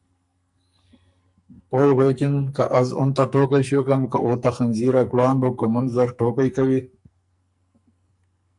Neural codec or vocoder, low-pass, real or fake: codec, 44.1 kHz, 2.6 kbps, SNAC; 10.8 kHz; fake